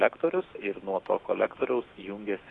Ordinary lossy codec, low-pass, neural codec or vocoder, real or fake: AAC, 32 kbps; 10.8 kHz; autoencoder, 48 kHz, 128 numbers a frame, DAC-VAE, trained on Japanese speech; fake